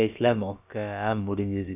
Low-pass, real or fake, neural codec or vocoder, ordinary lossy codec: 3.6 kHz; fake; codec, 16 kHz, about 1 kbps, DyCAST, with the encoder's durations; none